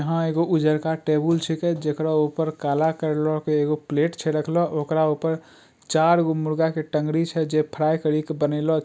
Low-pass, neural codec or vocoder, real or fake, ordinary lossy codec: none; none; real; none